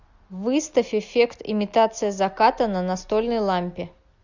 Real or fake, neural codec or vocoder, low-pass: real; none; 7.2 kHz